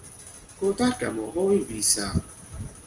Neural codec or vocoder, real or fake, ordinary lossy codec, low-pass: none; real; Opus, 32 kbps; 10.8 kHz